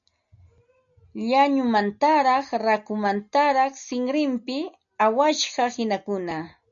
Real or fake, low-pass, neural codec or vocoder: real; 7.2 kHz; none